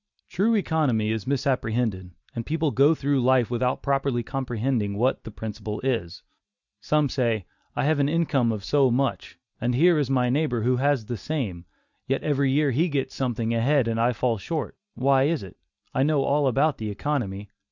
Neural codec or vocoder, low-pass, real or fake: none; 7.2 kHz; real